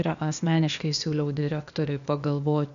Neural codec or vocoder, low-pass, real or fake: codec, 16 kHz, 0.8 kbps, ZipCodec; 7.2 kHz; fake